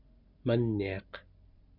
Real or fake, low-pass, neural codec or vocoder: real; 5.4 kHz; none